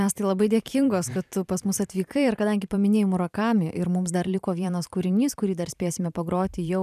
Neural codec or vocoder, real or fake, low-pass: vocoder, 44.1 kHz, 128 mel bands every 512 samples, BigVGAN v2; fake; 14.4 kHz